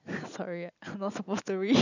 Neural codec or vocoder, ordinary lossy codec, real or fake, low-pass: none; none; real; 7.2 kHz